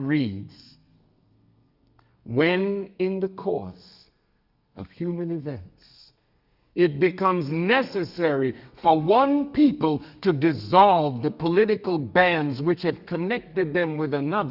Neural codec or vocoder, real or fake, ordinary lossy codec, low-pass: codec, 32 kHz, 1.9 kbps, SNAC; fake; Opus, 64 kbps; 5.4 kHz